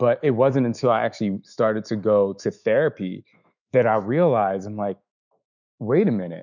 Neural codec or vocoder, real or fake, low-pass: autoencoder, 48 kHz, 128 numbers a frame, DAC-VAE, trained on Japanese speech; fake; 7.2 kHz